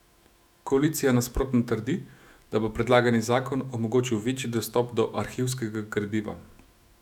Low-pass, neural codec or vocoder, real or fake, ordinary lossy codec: 19.8 kHz; autoencoder, 48 kHz, 128 numbers a frame, DAC-VAE, trained on Japanese speech; fake; none